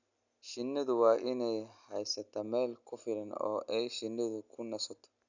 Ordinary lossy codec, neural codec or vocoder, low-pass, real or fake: none; none; 7.2 kHz; real